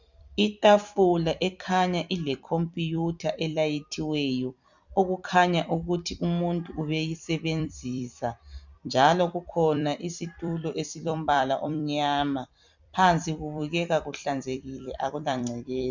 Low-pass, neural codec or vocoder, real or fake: 7.2 kHz; vocoder, 44.1 kHz, 128 mel bands every 256 samples, BigVGAN v2; fake